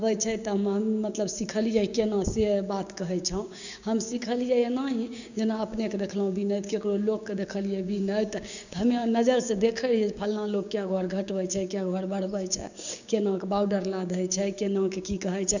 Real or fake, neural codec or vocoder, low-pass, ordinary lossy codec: fake; codec, 16 kHz, 6 kbps, DAC; 7.2 kHz; none